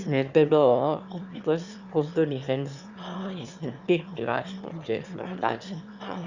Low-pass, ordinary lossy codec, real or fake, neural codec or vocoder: 7.2 kHz; Opus, 64 kbps; fake; autoencoder, 22.05 kHz, a latent of 192 numbers a frame, VITS, trained on one speaker